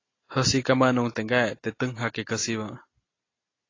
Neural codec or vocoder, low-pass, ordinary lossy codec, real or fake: none; 7.2 kHz; AAC, 32 kbps; real